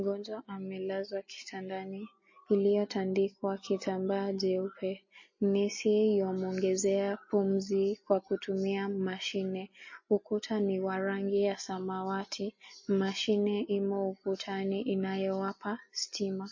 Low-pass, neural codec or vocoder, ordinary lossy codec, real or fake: 7.2 kHz; none; MP3, 32 kbps; real